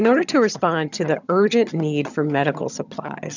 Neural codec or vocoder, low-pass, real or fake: vocoder, 22.05 kHz, 80 mel bands, HiFi-GAN; 7.2 kHz; fake